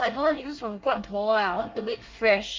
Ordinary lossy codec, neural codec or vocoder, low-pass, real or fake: Opus, 24 kbps; codec, 24 kHz, 1 kbps, SNAC; 7.2 kHz; fake